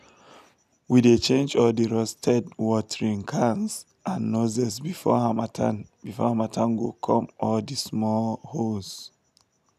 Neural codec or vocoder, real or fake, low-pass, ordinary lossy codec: none; real; 14.4 kHz; none